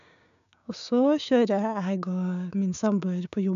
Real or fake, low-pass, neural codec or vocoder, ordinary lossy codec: fake; 7.2 kHz; codec, 16 kHz, 6 kbps, DAC; none